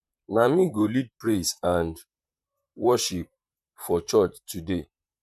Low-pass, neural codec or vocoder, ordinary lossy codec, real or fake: 14.4 kHz; vocoder, 44.1 kHz, 128 mel bands, Pupu-Vocoder; none; fake